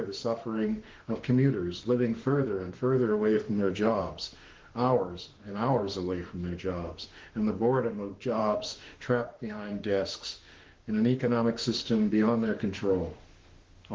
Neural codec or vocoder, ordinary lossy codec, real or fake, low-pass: autoencoder, 48 kHz, 32 numbers a frame, DAC-VAE, trained on Japanese speech; Opus, 16 kbps; fake; 7.2 kHz